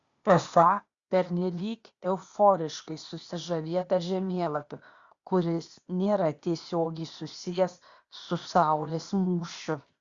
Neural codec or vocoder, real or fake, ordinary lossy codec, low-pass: codec, 16 kHz, 0.8 kbps, ZipCodec; fake; Opus, 64 kbps; 7.2 kHz